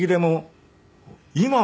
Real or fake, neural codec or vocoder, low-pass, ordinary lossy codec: real; none; none; none